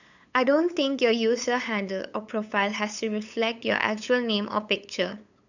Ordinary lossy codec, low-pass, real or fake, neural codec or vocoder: none; 7.2 kHz; fake; codec, 16 kHz, 8 kbps, FunCodec, trained on LibriTTS, 25 frames a second